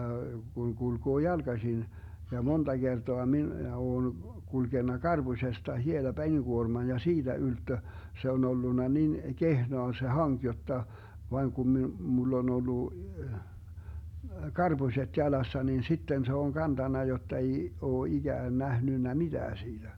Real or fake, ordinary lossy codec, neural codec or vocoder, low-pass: real; none; none; 19.8 kHz